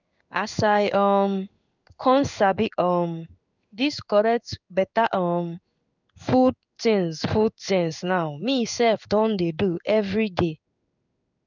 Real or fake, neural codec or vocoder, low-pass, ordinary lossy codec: fake; codec, 16 kHz in and 24 kHz out, 1 kbps, XY-Tokenizer; 7.2 kHz; none